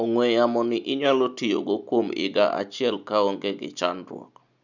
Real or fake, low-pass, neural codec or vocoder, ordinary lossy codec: real; 7.2 kHz; none; none